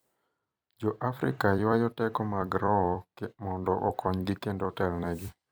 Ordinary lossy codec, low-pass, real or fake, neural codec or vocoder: none; none; fake; vocoder, 44.1 kHz, 128 mel bands every 256 samples, BigVGAN v2